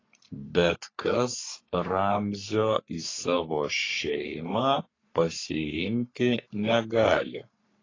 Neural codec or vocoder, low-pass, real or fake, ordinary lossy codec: codec, 44.1 kHz, 3.4 kbps, Pupu-Codec; 7.2 kHz; fake; AAC, 32 kbps